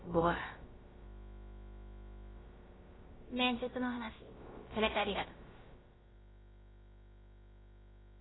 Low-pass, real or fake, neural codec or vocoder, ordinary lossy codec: 7.2 kHz; fake; codec, 16 kHz, about 1 kbps, DyCAST, with the encoder's durations; AAC, 16 kbps